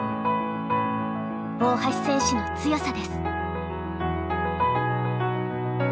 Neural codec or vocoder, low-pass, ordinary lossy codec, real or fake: none; none; none; real